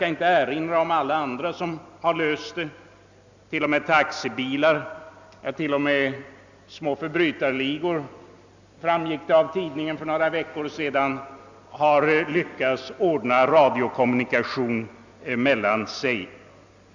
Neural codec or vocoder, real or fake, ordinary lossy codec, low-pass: none; real; Opus, 64 kbps; 7.2 kHz